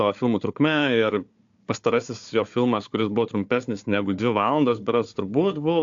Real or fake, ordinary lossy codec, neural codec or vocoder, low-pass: fake; AAC, 64 kbps; codec, 16 kHz, 4 kbps, FunCodec, trained on Chinese and English, 50 frames a second; 7.2 kHz